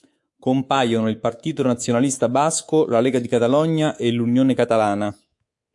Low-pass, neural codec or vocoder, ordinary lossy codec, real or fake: 10.8 kHz; codec, 24 kHz, 3.1 kbps, DualCodec; AAC, 64 kbps; fake